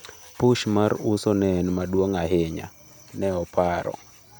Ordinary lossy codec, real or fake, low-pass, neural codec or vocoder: none; real; none; none